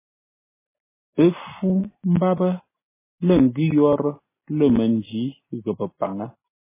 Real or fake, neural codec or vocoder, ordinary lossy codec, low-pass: real; none; MP3, 16 kbps; 3.6 kHz